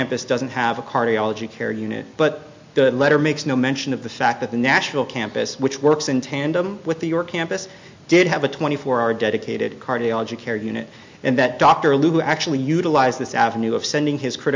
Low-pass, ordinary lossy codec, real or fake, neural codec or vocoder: 7.2 kHz; MP3, 64 kbps; real; none